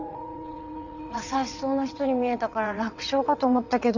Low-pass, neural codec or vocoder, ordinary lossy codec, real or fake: 7.2 kHz; vocoder, 22.05 kHz, 80 mel bands, WaveNeXt; none; fake